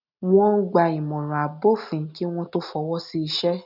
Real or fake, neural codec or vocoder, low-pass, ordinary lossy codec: real; none; 5.4 kHz; none